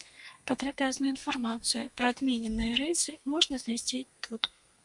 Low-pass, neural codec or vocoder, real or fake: 10.8 kHz; codec, 32 kHz, 1.9 kbps, SNAC; fake